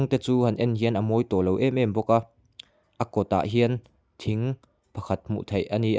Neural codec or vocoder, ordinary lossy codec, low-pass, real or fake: none; none; none; real